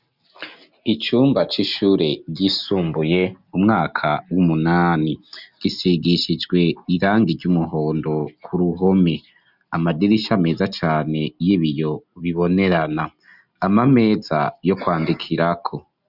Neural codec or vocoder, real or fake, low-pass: none; real; 5.4 kHz